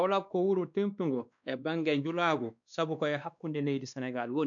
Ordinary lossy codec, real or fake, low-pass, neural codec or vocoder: none; fake; 7.2 kHz; codec, 16 kHz, 2 kbps, X-Codec, WavLM features, trained on Multilingual LibriSpeech